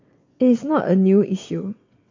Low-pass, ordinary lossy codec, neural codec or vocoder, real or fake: 7.2 kHz; MP3, 48 kbps; vocoder, 22.05 kHz, 80 mel bands, WaveNeXt; fake